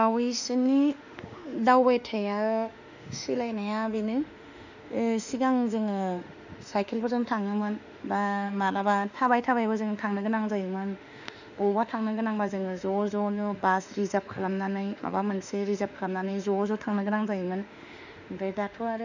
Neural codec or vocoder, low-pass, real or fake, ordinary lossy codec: autoencoder, 48 kHz, 32 numbers a frame, DAC-VAE, trained on Japanese speech; 7.2 kHz; fake; none